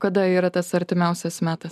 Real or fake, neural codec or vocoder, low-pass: real; none; 14.4 kHz